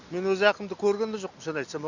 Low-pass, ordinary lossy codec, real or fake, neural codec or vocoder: 7.2 kHz; none; real; none